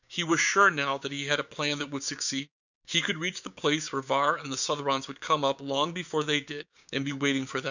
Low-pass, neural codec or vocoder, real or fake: 7.2 kHz; codec, 16 kHz, 6 kbps, DAC; fake